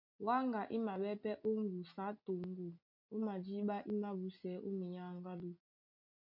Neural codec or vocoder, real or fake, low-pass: none; real; 5.4 kHz